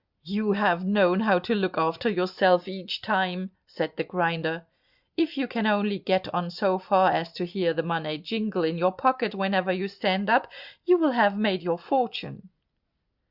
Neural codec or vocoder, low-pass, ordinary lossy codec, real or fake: none; 5.4 kHz; Opus, 64 kbps; real